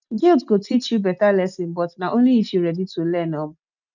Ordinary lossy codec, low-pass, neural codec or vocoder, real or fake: none; 7.2 kHz; none; real